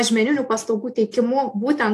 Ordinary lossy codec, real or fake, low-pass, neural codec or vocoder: AAC, 64 kbps; real; 14.4 kHz; none